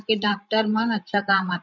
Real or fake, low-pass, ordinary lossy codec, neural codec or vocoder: fake; 7.2 kHz; none; codec, 16 kHz, 16 kbps, FreqCodec, larger model